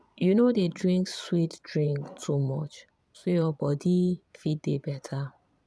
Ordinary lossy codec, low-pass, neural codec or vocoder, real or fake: none; none; vocoder, 22.05 kHz, 80 mel bands, Vocos; fake